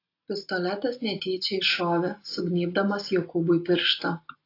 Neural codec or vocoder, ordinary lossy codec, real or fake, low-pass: none; AAC, 32 kbps; real; 5.4 kHz